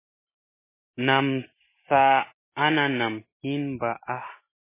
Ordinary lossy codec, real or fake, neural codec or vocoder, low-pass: MP3, 16 kbps; real; none; 3.6 kHz